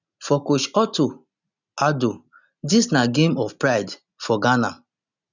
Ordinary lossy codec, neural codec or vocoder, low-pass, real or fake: none; none; 7.2 kHz; real